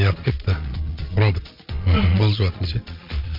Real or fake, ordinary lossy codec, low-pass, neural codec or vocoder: fake; MP3, 48 kbps; 5.4 kHz; vocoder, 22.05 kHz, 80 mel bands, Vocos